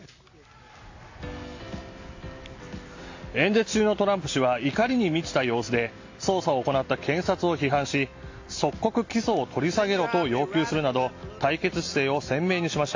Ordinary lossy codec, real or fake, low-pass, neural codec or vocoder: AAC, 48 kbps; real; 7.2 kHz; none